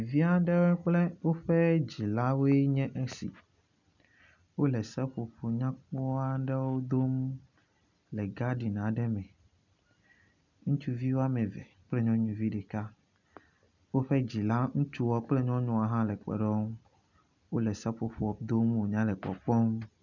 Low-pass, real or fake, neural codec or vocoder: 7.2 kHz; real; none